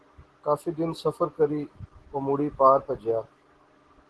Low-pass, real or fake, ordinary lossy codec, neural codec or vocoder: 10.8 kHz; real; Opus, 16 kbps; none